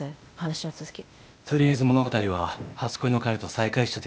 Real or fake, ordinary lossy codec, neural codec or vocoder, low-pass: fake; none; codec, 16 kHz, 0.8 kbps, ZipCodec; none